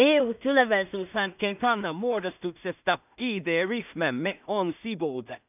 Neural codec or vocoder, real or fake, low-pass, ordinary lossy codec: codec, 16 kHz in and 24 kHz out, 0.4 kbps, LongCat-Audio-Codec, two codebook decoder; fake; 3.6 kHz; none